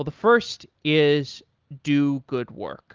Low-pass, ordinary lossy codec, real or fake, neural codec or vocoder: 7.2 kHz; Opus, 32 kbps; real; none